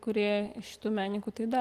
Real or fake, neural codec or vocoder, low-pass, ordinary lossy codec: real; none; 14.4 kHz; Opus, 24 kbps